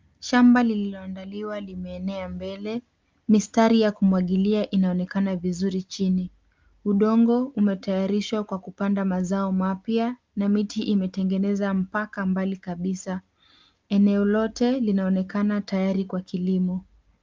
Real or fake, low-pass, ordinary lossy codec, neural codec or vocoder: real; 7.2 kHz; Opus, 32 kbps; none